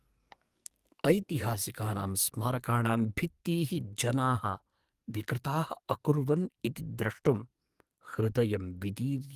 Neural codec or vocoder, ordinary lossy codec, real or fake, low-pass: codec, 44.1 kHz, 2.6 kbps, SNAC; Opus, 32 kbps; fake; 14.4 kHz